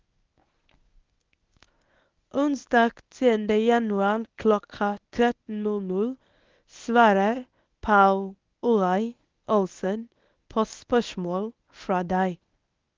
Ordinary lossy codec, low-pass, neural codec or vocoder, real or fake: Opus, 32 kbps; 7.2 kHz; codec, 24 kHz, 0.9 kbps, WavTokenizer, medium speech release version 1; fake